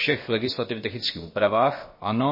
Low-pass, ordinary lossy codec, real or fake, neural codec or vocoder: 5.4 kHz; MP3, 24 kbps; fake; codec, 16 kHz, about 1 kbps, DyCAST, with the encoder's durations